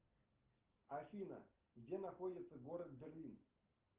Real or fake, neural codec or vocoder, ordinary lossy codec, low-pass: real; none; Opus, 16 kbps; 3.6 kHz